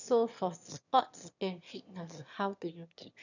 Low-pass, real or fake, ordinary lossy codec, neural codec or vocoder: 7.2 kHz; fake; none; autoencoder, 22.05 kHz, a latent of 192 numbers a frame, VITS, trained on one speaker